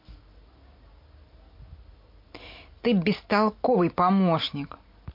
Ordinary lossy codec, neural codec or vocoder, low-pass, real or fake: MP3, 32 kbps; none; 5.4 kHz; real